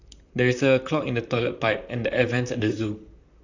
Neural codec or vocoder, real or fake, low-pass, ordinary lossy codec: vocoder, 44.1 kHz, 128 mel bands, Pupu-Vocoder; fake; 7.2 kHz; none